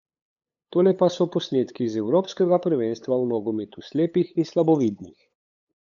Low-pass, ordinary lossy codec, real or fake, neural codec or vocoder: 7.2 kHz; none; fake; codec, 16 kHz, 8 kbps, FunCodec, trained on LibriTTS, 25 frames a second